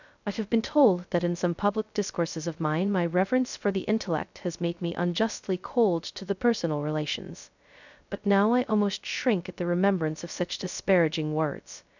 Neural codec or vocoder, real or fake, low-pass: codec, 16 kHz, 0.2 kbps, FocalCodec; fake; 7.2 kHz